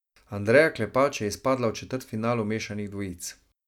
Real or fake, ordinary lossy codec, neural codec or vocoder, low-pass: real; none; none; 19.8 kHz